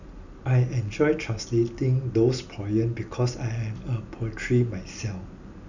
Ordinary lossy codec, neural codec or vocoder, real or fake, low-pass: none; none; real; 7.2 kHz